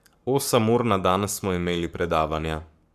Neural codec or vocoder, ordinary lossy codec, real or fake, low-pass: codec, 44.1 kHz, 7.8 kbps, Pupu-Codec; none; fake; 14.4 kHz